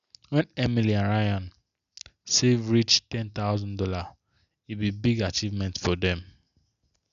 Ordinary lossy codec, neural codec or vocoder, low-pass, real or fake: none; none; 7.2 kHz; real